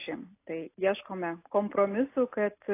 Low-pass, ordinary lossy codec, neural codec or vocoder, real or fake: 3.6 kHz; AAC, 24 kbps; none; real